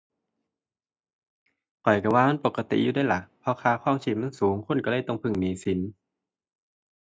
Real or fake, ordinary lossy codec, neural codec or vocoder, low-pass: real; none; none; none